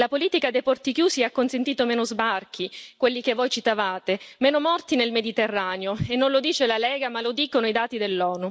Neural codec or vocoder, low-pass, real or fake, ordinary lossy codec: none; none; real; none